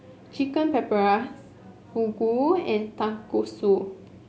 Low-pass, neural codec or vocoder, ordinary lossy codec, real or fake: none; none; none; real